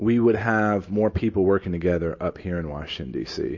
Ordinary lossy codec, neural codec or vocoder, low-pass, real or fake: MP3, 32 kbps; none; 7.2 kHz; real